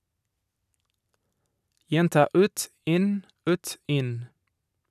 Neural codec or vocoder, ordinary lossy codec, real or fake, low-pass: none; none; real; 14.4 kHz